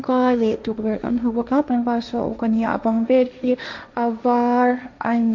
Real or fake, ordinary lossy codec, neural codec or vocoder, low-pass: fake; MP3, 64 kbps; codec, 16 kHz, 1.1 kbps, Voila-Tokenizer; 7.2 kHz